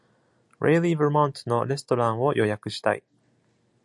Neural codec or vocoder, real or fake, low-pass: none; real; 10.8 kHz